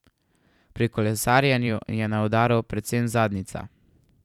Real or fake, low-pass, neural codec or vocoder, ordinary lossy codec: fake; 19.8 kHz; vocoder, 44.1 kHz, 128 mel bands every 512 samples, BigVGAN v2; none